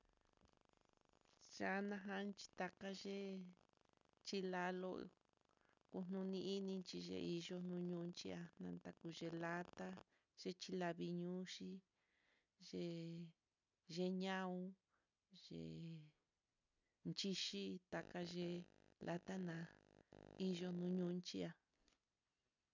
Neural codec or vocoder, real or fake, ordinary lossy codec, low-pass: none; real; none; 7.2 kHz